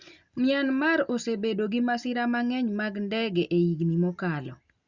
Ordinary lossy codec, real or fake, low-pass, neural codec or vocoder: Opus, 64 kbps; real; 7.2 kHz; none